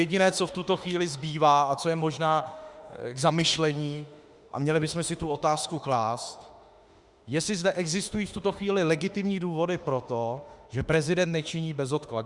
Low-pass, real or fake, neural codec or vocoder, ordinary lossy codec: 10.8 kHz; fake; autoencoder, 48 kHz, 32 numbers a frame, DAC-VAE, trained on Japanese speech; Opus, 64 kbps